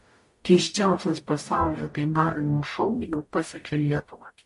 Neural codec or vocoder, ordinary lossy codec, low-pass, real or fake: codec, 44.1 kHz, 0.9 kbps, DAC; MP3, 48 kbps; 14.4 kHz; fake